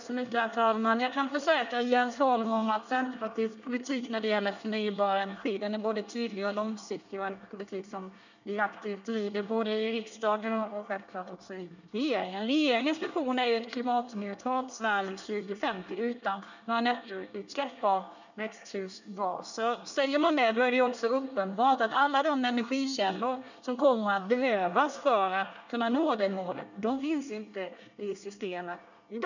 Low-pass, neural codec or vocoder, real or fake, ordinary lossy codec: 7.2 kHz; codec, 24 kHz, 1 kbps, SNAC; fake; none